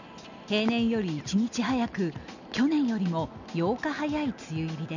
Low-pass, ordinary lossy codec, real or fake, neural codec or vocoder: 7.2 kHz; none; real; none